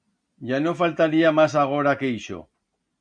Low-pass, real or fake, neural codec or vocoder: 9.9 kHz; real; none